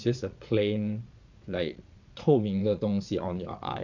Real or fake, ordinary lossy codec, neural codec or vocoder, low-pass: fake; none; vocoder, 22.05 kHz, 80 mel bands, Vocos; 7.2 kHz